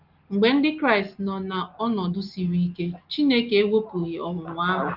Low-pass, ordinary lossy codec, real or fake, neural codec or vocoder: 5.4 kHz; Opus, 32 kbps; real; none